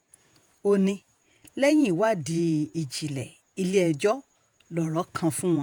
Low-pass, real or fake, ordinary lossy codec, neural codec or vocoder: none; fake; none; vocoder, 48 kHz, 128 mel bands, Vocos